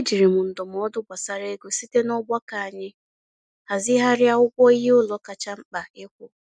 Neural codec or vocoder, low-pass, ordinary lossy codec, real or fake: none; none; none; real